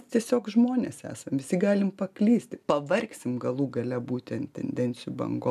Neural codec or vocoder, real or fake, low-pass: none; real; 14.4 kHz